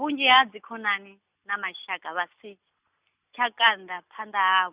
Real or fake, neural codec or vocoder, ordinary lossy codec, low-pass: real; none; Opus, 64 kbps; 3.6 kHz